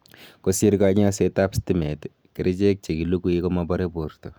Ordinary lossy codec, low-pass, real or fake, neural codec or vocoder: none; none; real; none